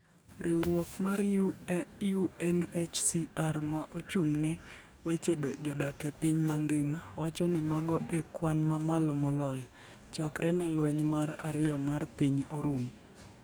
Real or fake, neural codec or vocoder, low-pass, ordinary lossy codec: fake; codec, 44.1 kHz, 2.6 kbps, DAC; none; none